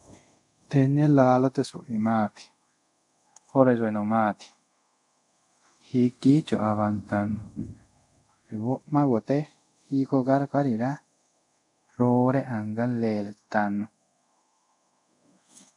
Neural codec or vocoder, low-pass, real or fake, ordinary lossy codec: codec, 24 kHz, 0.5 kbps, DualCodec; 10.8 kHz; fake; MP3, 96 kbps